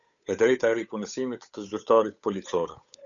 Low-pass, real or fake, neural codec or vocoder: 7.2 kHz; fake; codec, 16 kHz, 8 kbps, FunCodec, trained on Chinese and English, 25 frames a second